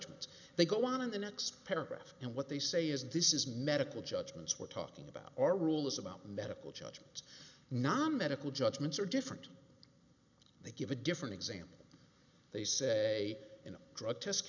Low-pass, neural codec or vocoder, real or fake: 7.2 kHz; none; real